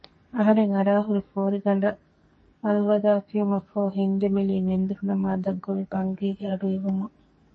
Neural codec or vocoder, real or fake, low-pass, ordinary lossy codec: codec, 44.1 kHz, 2.6 kbps, SNAC; fake; 10.8 kHz; MP3, 32 kbps